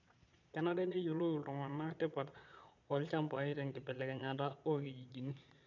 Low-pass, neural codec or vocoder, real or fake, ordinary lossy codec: 7.2 kHz; vocoder, 22.05 kHz, 80 mel bands, Vocos; fake; none